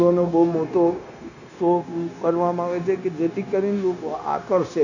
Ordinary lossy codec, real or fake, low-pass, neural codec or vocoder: none; fake; 7.2 kHz; codec, 16 kHz, 0.9 kbps, LongCat-Audio-Codec